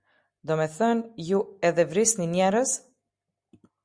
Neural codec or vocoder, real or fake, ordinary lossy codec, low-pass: none; real; Opus, 64 kbps; 9.9 kHz